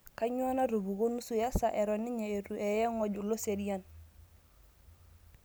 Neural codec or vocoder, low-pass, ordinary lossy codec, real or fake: none; none; none; real